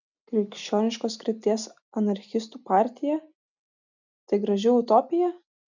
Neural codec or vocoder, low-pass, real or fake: none; 7.2 kHz; real